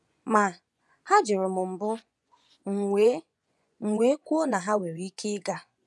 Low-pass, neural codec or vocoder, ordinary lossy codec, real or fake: none; vocoder, 24 kHz, 100 mel bands, Vocos; none; fake